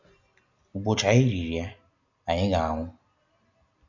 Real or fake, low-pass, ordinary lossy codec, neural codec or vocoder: real; 7.2 kHz; none; none